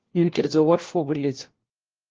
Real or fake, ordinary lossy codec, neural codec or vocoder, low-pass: fake; Opus, 16 kbps; codec, 16 kHz, 1 kbps, FunCodec, trained on LibriTTS, 50 frames a second; 7.2 kHz